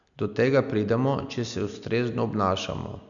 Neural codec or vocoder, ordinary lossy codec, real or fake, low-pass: none; none; real; 7.2 kHz